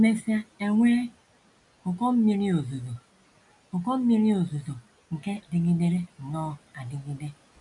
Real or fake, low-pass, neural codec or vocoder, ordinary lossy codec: real; 10.8 kHz; none; none